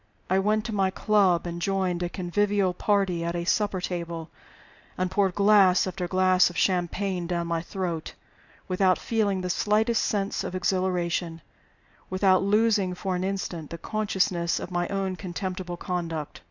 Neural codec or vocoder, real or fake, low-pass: none; real; 7.2 kHz